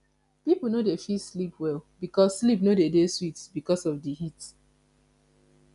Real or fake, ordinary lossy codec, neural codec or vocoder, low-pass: real; none; none; 10.8 kHz